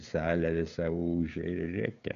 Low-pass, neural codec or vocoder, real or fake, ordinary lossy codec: 7.2 kHz; codec, 16 kHz, 16 kbps, FreqCodec, smaller model; fake; AAC, 96 kbps